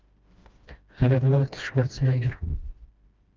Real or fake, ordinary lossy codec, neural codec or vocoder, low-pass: fake; Opus, 32 kbps; codec, 16 kHz, 1 kbps, FreqCodec, smaller model; 7.2 kHz